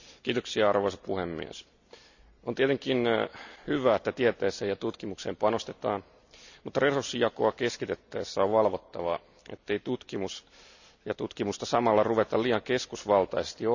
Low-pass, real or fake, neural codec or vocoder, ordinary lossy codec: 7.2 kHz; real; none; none